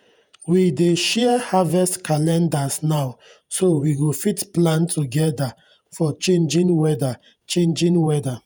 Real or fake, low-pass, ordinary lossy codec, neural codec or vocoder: fake; none; none; vocoder, 48 kHz, 128 mel bands, Vocos